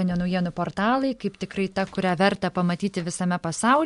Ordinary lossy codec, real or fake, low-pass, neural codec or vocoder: MP3, 64 kbps; real; 10.8 kHz; none